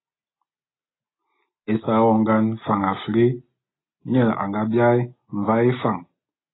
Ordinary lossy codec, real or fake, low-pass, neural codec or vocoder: AAC, 16 kbps; real; 7.2 kHz; none